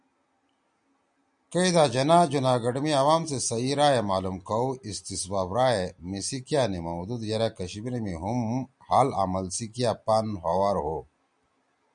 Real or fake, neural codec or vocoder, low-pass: real; none; 9.9 kHz